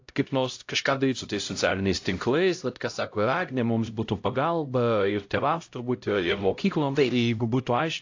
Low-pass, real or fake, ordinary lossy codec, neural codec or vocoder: 7.2 kHz; fake; AAC, 48 kbps; codec, 16 kHz, 0.5 kbps, X-Codec, HuBERT features, trained on LibriSpeech